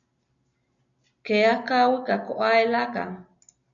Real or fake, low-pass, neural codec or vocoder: real; 7.2 kHz; none